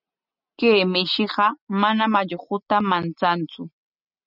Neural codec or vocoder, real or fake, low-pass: none; real; 5.4 kHz